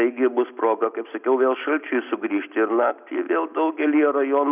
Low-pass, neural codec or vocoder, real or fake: 3.6 kHz; none; real